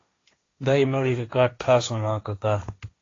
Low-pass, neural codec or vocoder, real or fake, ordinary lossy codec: 7.2 kHz; codec, 16 kHz, 1.1 kbps, Voila-Tokenizer; fake; AAC, 32 kbps